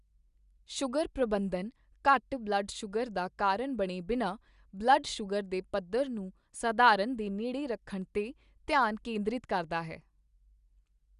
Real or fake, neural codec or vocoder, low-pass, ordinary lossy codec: real; none; 10.8 kHz; Opus, 64 kbps